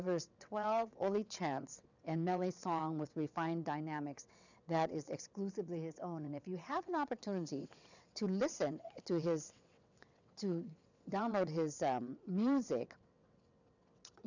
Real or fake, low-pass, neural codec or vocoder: fake; 7.2 kHz; vocoder, 22.05 kHz, 80 mel bands, WaveNeXt